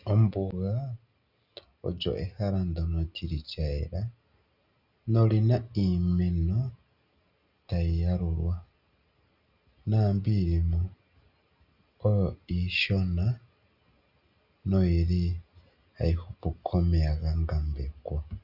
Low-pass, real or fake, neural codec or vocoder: 5.4 kHz; real; none